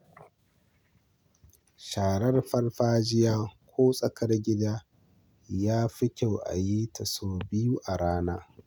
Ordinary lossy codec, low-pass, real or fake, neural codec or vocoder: none; none; real; none